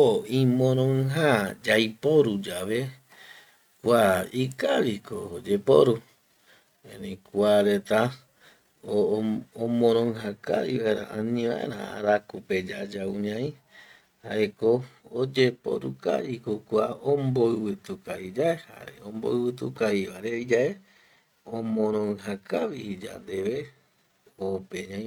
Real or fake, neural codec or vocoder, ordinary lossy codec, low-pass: real; none; none; 19.8 kHz